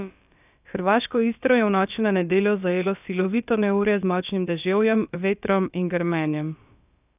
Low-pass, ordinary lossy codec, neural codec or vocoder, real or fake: 3.6 kHz; none; codec, 16 kHz, about 1 kbps, DyCAST, with the encoder's durations; fake